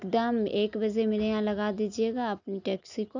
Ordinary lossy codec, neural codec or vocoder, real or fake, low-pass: none; none; real; 7.2 kHz